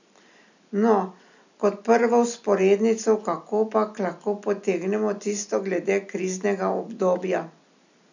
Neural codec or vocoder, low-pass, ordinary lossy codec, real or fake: none; 7.2 kHz; none; real